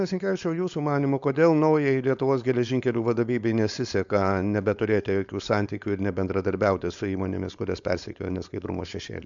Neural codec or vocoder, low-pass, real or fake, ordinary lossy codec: codec, 16 kHz, 4.8 kbps, FACodec; 7.2 kHz; fake; MP3, 64 kbps